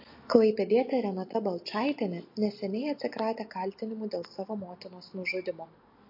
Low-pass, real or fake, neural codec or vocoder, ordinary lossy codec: 5.4 kHz; real; none; MP3, 32 kbps